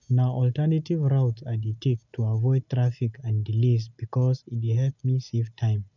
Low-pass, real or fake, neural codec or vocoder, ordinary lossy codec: 7.2 kHz; real; none; none